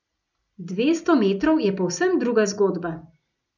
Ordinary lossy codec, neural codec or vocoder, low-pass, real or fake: none; none; 7.2 kHz; real